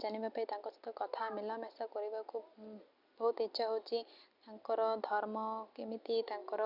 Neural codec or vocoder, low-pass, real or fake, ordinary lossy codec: none; 5.4 kHz; real; none